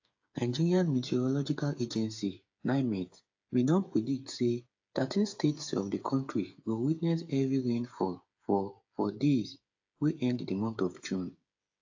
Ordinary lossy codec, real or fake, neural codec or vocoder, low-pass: AAC, 48 kbps; fake; codec, 16 kHz, 8 kbps, FreqCodec, smaller model; 7.2 kHz